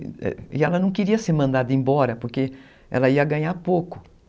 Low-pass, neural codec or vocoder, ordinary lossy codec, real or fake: none; none; none; real